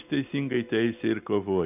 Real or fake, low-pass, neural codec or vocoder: real; 3.6 kHz; none